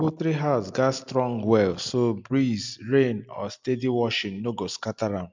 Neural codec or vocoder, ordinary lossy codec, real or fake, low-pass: none; MP3, 64 kbps; real; 7.2 kHz